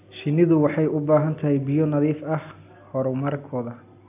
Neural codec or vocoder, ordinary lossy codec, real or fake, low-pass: none; AAC, 32 kbps; real; 3.6 kHz